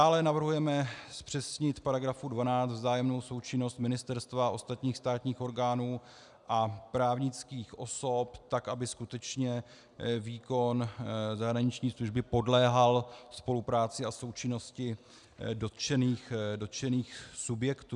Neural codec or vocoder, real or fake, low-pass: none; real; 10.8 kHz